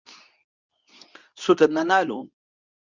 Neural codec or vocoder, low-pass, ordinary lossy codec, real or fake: codec, 24 kHz, 0.9 kbps, WavTokenizer, medium speech release version 2; 7.2 kHz; Opus, 64 kbps; fake